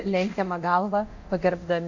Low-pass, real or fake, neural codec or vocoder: 7.2 kHz; fake; codec, 24 kHz, 0.9 kbps, DualCodec